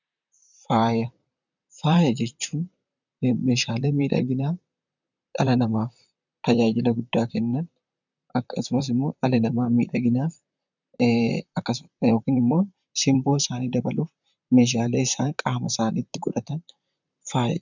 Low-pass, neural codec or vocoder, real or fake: 7.2 kHz; vocoder, 44.1 kHz, 128 mel bands, Pupu-Vocoder; fake